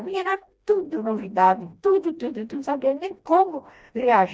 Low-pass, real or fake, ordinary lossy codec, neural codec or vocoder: none; fake; none; codec, 16 kHz, 1 kbps, FreqCodec, smaller model